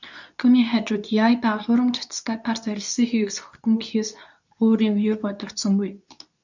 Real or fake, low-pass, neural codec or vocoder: fake; 7.2 kHz; codec, 24 kHz, 0.9 kbps, WavTokenizer, medium speech release version 2